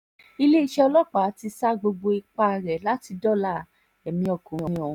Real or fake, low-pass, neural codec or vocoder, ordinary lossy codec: real; 19.8 kHz; none; none